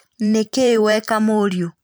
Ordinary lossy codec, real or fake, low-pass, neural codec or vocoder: none; fake; none; vocoder, 44.1 kHz, 128 mel bands every 512 samples, BigVGAN v2